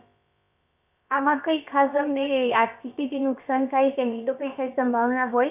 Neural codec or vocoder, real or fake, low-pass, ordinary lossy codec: codec, 16 kHz, about 1 kbps, DyCAST, with the encoder's durations; fake; 3.6 kHz; none